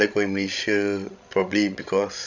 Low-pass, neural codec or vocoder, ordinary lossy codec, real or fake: 7.2 kHz; codec, 16 kHz, 16 kbps, FreqCodec, larger model; none; fake